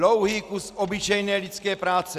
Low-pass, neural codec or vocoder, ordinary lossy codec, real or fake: 14.4 kHz; none; Opus, 64 kbps; real